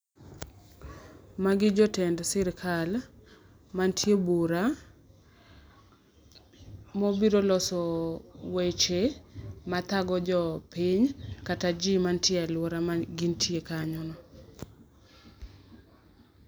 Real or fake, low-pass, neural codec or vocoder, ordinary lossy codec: real; none; none; none